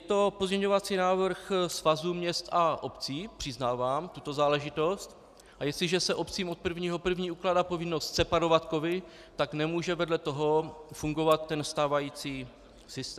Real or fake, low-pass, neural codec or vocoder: real; 14.4 kHz; none